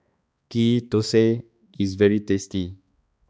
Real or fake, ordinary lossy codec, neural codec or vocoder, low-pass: fake; none; codec, 16 kHz, 2 kbps, X-Codec, HuBERT features, trained on balanced general audio; none